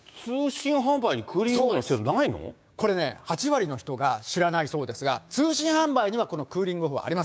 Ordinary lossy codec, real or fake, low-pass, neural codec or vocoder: none; fake; none; codec, 16 kHz, 6 kbps, DAC